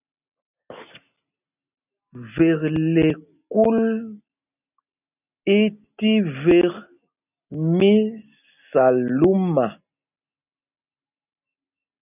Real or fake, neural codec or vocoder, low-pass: real; none; 3.6 kHz